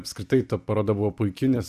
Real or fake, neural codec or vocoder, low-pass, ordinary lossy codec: fake; vocoder, 44.1 kHz, 128 mel bands every 256 samples, BigVGAN v2; 14.4 kHz; Opus, 64 kbps